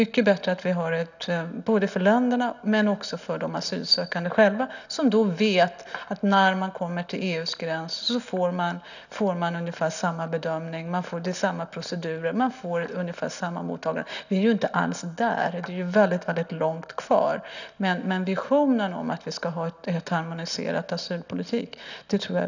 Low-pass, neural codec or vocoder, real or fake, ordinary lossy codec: 7.2 kHz; none; real; none